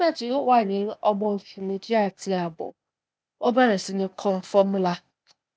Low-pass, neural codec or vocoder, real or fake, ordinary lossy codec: none; codec, 16 kHz, 0.8 kbps, ZipCodec; fake; none